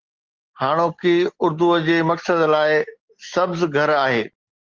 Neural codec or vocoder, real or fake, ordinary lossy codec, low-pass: none; real; Opus, 16 kbps; 7.2 kHz